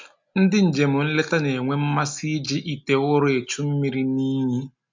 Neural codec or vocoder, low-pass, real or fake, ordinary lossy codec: none; 7.2 kHz; real; MP3, 64 kbps